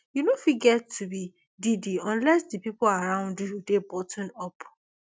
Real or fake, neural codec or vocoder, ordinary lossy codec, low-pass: real; none; none; none